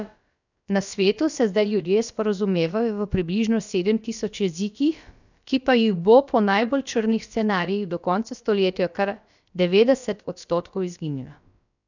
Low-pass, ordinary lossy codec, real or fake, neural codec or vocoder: 7.2 kHz; none; fake; codec, 16 kHz, about 1 kbps, DyCAST, with the encoder's durations